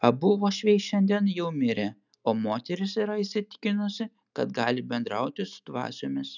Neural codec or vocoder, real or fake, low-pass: none; real; 7.2 kHz